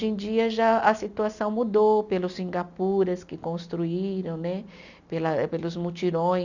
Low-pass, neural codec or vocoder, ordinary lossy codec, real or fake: 7.2 kHz; none; none; real